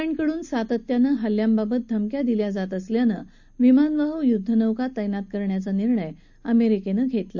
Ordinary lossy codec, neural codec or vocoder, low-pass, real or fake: none; none; 7.2 kHz; real